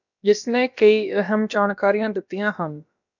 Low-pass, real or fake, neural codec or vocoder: 7.2 kHz; fake; codec, 16 kHz, about 1 kbps, DyCAST, with the encoder's durations